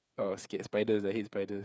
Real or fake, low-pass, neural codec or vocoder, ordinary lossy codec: fake; none; codec, 16 kHz, 8 kbps, FreqCodec, smaller model; none